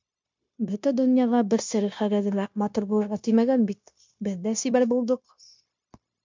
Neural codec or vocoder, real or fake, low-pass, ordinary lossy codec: codec, 16 kHz, 0.9 kbps, LongCat-Audio-Codec; fake; 7.2 kHz; MP3, 64 kbps